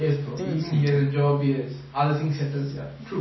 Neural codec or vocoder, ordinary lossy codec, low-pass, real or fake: none; MP3, 24 kbps; 7.2 kHz; real